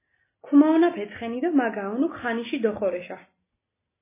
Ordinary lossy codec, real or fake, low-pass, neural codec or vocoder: MP3, 16 kbps; real; 3.6 kHz; none